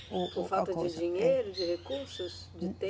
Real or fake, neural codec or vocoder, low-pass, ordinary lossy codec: real; none; none; none